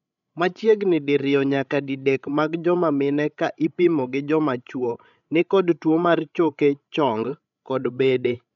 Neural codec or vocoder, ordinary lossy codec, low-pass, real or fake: codec, 16 kHz, 16 kbps, FreqCodec, larger model; none; 7.2 kHz; fake